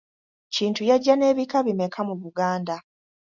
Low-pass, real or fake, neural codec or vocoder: 7.2 kHz; real; none